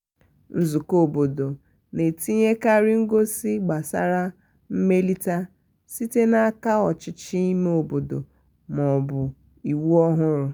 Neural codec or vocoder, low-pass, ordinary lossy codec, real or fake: none; none; none; real